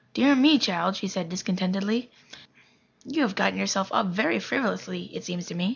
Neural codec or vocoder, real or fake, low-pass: none; real; 7.2 kHz